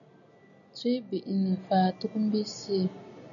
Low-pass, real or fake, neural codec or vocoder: 7.2 kHz; real; none